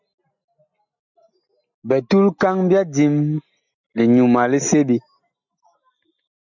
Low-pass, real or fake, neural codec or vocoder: 7.2 kHz; real; none